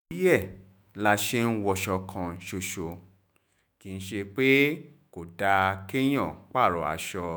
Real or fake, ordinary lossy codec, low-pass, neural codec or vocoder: fake; none; none; autoencoder, 48 kHz, 128 numbers a frame, DAC-VAE, trained on Japanese speech